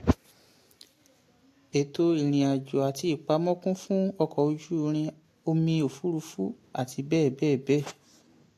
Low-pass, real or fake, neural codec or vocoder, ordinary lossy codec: 14.4 kHz; real; none; AAC, 64 kbps